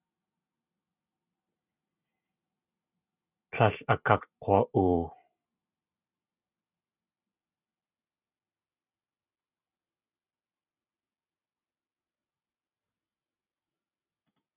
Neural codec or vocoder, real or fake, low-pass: none; real; 3.6 kHz